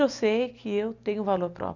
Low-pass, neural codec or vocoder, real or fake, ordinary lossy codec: 7.2 kHz; none; real; none